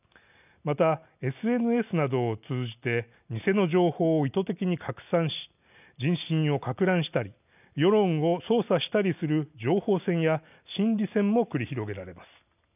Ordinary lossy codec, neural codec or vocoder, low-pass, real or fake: none; none; 3.6 kHz; real